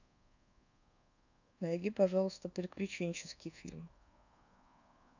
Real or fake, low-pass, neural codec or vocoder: fake; 7.2 kHz; codec, 24 kHz, 1.2 kbps, DualCodec